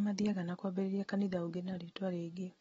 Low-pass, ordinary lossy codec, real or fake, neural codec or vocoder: 19.8 kHz; AAC, 24 kbps; real; none